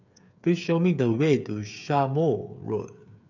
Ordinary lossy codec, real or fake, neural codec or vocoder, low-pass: none; fake; codec, 16 kHz, 8 kbps, FreqCodec, smaller model; 7.2 kHz